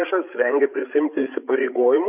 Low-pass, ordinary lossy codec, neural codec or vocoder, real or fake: 3.6 kHz; AAC, 32 kbps; codec, 16 kHz, 8 kbps, FreqCodec, larger model; fake